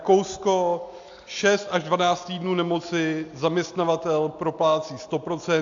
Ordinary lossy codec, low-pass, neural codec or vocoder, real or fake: AAC, 64 kbps; 7.2 kHz; none; real